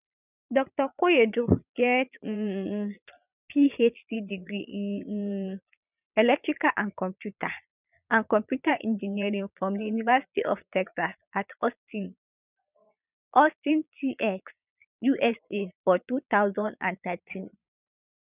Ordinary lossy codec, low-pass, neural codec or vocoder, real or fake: none; 3.6 kHz; vocoder, 22.05 kHz, 80 mel bands, Vocos; fake